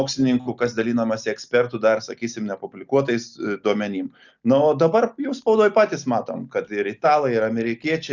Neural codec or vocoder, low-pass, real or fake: none; 7.2 kHz; real